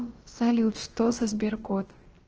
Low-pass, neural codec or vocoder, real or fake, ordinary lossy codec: 7.2 kHz; codec, 16 kHz, about 1 kbps, DyCAST, with the encoder's durations; fake; Opus, 16 kbps